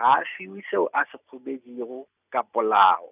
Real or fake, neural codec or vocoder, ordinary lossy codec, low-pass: real; none; none; 3.6 kHz